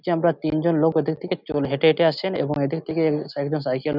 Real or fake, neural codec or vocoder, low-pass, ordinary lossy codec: real; none; 5.4 kHz; none